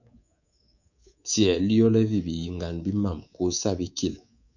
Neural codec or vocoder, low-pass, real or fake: codec, 24 kHz, 3.1 kbps, DualCodec; 7.2 kHz; fake